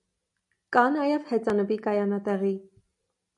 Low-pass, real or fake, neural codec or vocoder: 10.8 kHz; real; none